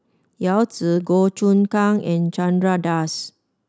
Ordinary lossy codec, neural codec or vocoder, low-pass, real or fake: none; none; none; real